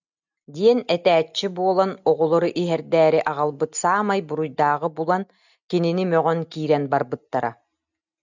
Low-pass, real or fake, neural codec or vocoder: 7.2 kHz; real; none